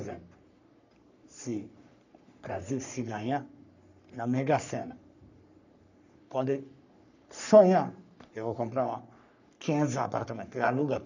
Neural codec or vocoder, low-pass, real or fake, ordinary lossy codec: codec, 44.1 kHz, 3.4 kbps, Pupu-Codec; 7.2 kHz; fake; none